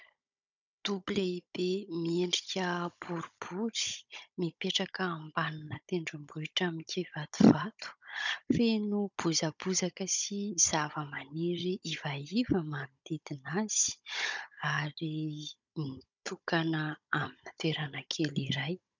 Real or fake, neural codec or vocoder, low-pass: fake; codec, 16 kHz, 16 kbps, FunCodec, trained on Chinese and English, 50 frames a second; 7.2 kHz